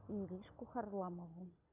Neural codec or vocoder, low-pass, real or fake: none; 3.6 kHz; real